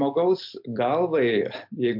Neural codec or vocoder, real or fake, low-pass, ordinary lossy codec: none; real; 5.4 kHz; MP3, 48 kbps